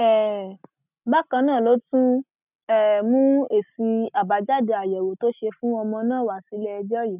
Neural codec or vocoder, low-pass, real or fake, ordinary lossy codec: none; 3.6 kHz; real; none